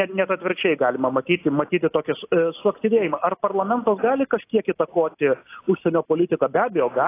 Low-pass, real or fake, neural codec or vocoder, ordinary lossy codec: 3.6 kHz; real; none; AAC, 24 kbps